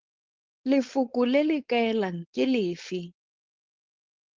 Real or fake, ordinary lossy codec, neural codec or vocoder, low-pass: fake; Opus, 16 kbps; codec, 16 kHz, 4.8 kbps, FACodec; 7.2 kHz